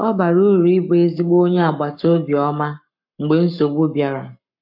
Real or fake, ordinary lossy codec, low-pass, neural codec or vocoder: fake; none; 5.4 kHz; codec, 44.1 kHz, 7.8 kbps, Pupu-Codec